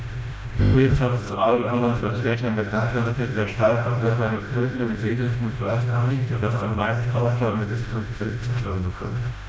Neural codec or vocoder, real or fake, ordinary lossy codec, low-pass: codec, 16 kHz, 0.5 kbps, FreqCodec, smaller model; fake; none; none